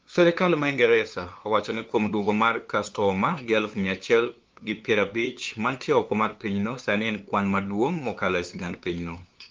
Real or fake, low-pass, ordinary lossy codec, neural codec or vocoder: fake; 7.2 kHz; Opus, 24 kbps; codec, 16 kHz, 2 kbps, FunCodec, trained on LibriTTS, 25 frames a second